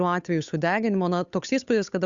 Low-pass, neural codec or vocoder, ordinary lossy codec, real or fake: 7.2 kHz; codec, 16 kHz, 8 kbps, FunCodec, trained on Chinese and English, 25 frames a second; Opus, 64 kbps; fake